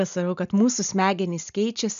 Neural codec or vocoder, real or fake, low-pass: none; real; 7.2 kHz